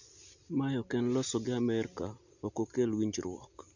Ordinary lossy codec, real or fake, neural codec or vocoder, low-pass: none; real; none; 7.2 kHz